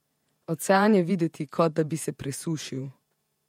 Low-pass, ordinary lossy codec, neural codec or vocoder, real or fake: 19.8 kHz; MP3, 64 kbps; vocoder, 48 kHz, 128 mel bands, Vocos; fake